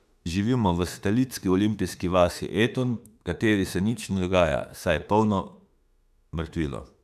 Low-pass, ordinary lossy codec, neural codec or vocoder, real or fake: 14.4 kHz; none; autoencoder, 48 kHz, 32 numbers a frame, DAC-VAE, trained on Japanese speech; fake